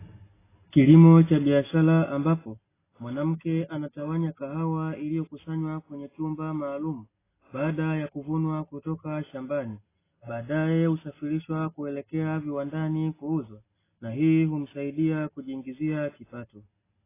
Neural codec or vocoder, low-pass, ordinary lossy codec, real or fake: none; 3.6 kHz; AAC, 16 kbps; real